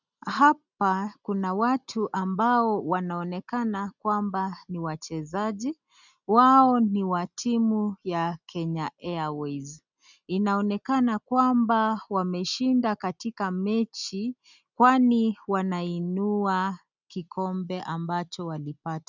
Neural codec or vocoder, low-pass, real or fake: none; 7.2 kHz; real